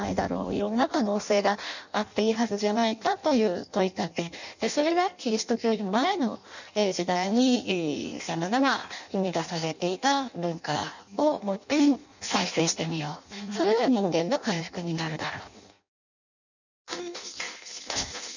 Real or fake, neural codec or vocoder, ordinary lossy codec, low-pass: fake; codec, 16 kHz in and 24 kHz out, 0.6 kbps, FireRedTTS-2 codec; none; 7.2 kHz